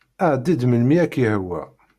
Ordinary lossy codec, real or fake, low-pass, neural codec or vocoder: Opus, 64 kbps; real; 14.4 kHz; none